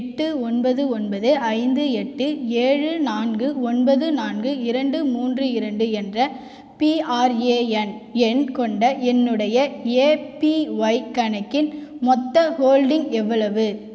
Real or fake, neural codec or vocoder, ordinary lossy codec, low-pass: real; none; none; none